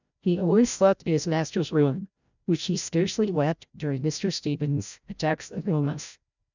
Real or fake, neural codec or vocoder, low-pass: fake; codec, 16 kHz, 0.5 kbps, FreqCodec, larger model; 7.2 kHz